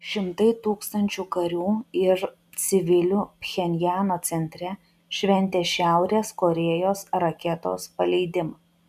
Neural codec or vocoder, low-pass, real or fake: none; 14.4 kHz; real